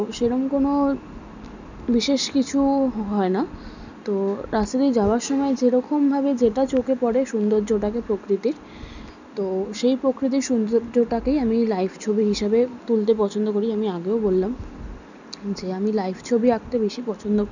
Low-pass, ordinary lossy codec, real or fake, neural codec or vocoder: 7.2 kHz; none; real; none